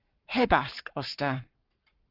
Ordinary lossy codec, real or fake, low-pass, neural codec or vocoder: Opus, 32 kbps; fake; 5.4 kHz; codec, 44.1 kHz, 7.8 kbps, Pupu-Codec